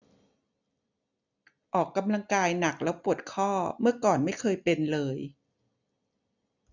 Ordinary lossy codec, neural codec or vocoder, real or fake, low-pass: none; none; real; 7.2 kHz